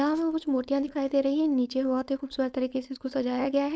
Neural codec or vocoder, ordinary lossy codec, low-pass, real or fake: codec, 16 kHz, 4.8 kbps, FACodec; none; none; fake